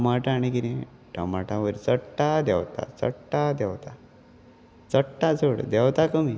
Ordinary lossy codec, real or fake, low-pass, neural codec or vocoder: none; real; none; none